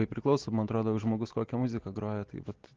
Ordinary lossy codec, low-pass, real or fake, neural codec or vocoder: Opus, 16 kbps; 7.2 kHz; real; none